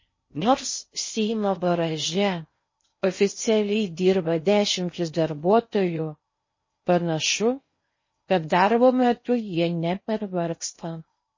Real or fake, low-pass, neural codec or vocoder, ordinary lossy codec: fake; 7.2 kHz; codec, 16 kHz in and 24 kHz out, 0.6 kbps, FocalCodec, streaming, 4096 codes; MP3, 32 kbps